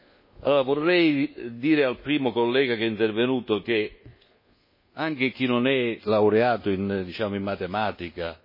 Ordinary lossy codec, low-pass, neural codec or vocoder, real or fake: MP3, 24 kbps; 5.4 kHz; codec, 24 kHz, 1.2 kbps, DualCodec; fake